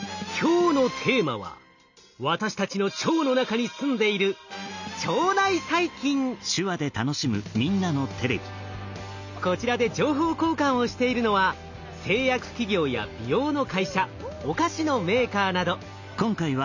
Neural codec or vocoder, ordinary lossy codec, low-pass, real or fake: none; none; 7.2 kHz; real